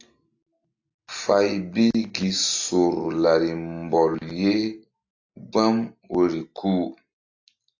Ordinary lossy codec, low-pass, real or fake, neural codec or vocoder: AAC, 48 kbps; 7.2 kHz; real; none